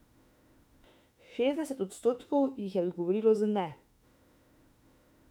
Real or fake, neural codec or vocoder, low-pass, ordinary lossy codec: fake; autoencoder, 48 kHz, 32 numbers a frame, DAC-VAE, trained on Japanese speech; 19.8 kHz; none